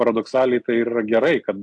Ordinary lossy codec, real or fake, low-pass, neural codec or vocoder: AAC, 64 kbps; real; 10.8 kHz; none